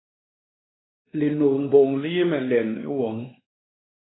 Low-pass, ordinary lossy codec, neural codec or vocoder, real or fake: 7.2 kHz; AAC, 16 kbps; codec, 16 kHz, 2 kbps, X-Codec, WavLM features, trained on Multilingual LibriSpeech; fake